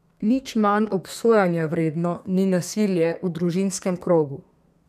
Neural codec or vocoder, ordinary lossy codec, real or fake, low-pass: codec, 32 kHz, 1.9 kbps, SNAC; none; fake; 14.4 kHz